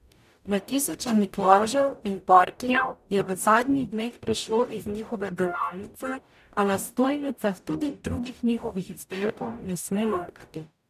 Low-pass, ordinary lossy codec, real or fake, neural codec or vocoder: 14.4 kHz; none; fake; codec, 44.1 kHz, 0.9 kbps, DAC